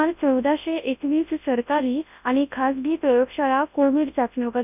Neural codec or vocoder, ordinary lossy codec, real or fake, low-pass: codec, 24 kHz, 0.9 kbps, WavTokenizer, large speech release; none; fake; 3.6 kHz